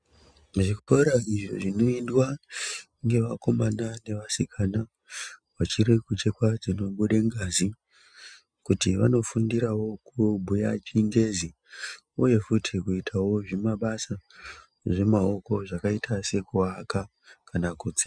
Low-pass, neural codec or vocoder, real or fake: 9.9 kHz; none; real